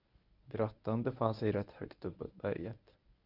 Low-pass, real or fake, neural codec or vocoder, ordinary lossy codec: 5.4 kHz; fake; codec, 24 kHz, 0.9 kbps, WavTokenizer, medium speech release version 1; Opus, 64 kbps